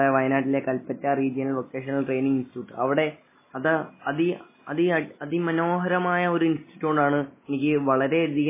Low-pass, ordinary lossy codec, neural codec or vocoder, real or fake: 3.6 kHz; MP3, 16 kbps; none; real